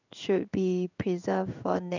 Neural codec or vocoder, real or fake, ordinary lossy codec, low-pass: codec, 16 kHz in and 24 kHz out, 1 kbps, XY-Tokenizer; fake; none; 7.2 kHz